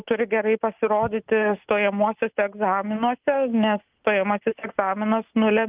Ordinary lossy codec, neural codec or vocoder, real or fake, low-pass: Opus, 24 kbps; none; real; 3.6 kHz